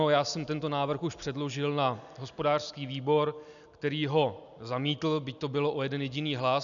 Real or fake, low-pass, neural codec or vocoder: real; 7.2 kHz; none